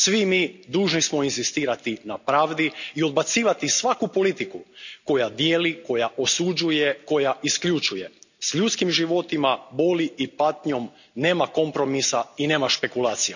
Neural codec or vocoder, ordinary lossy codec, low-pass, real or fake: none; none; 7.2 kHz; real